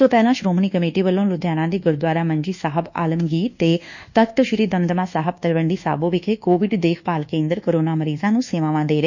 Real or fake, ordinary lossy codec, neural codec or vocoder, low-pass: fake; none; codec, 24 kHz, 1.2 kbps, DualCodec; 7.2 kHz